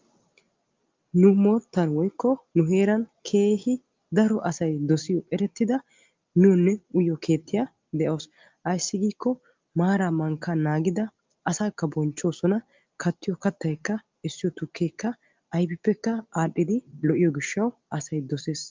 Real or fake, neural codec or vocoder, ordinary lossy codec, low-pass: real; none; Opus, 24 kbps; 7.2 kHz